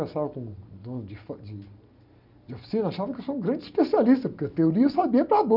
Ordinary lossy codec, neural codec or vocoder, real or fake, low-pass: none; none; real; 5.4 kHz